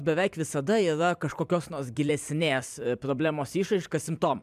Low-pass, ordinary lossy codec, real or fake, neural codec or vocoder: 14.4 kHz; MP3, 96 kbps; fake; vocoder, 44.1 kHz, 128 mel bands every 256 samples, BigVGAN v2